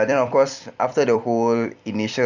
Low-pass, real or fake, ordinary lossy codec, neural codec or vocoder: 7.2 kHz; fake; none; vocoder, 44.1 kHz, 128 mel bands every 256 samples, BigVGAN v2